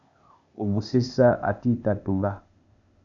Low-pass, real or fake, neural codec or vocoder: 7.2 kHz; fake; codec, 16 kHz, 0.8 kbps, ZipCodec